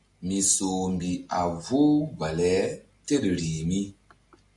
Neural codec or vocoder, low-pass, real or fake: none; 10.8 kHz; real